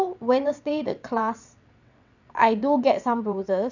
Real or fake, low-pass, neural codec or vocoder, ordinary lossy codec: fake; 7.2 kHz; vocoder, 22.05 kHz, 80 mel bands, WaveNeXt; none